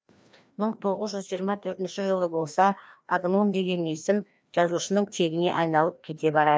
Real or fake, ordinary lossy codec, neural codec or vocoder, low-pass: fake; none; codec, 16 kHz, 1 kbps, FreqCodec, larger model; none